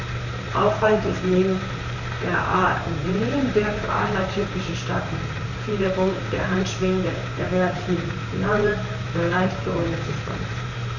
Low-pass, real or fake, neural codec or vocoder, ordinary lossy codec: 7.2 kHz; fake; vocoder, 44.1 kHz, 128 mel bands, Pupu-Vocoder; none